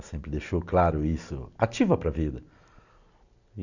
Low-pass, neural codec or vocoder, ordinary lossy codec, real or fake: 7.2 kHz; none; none; real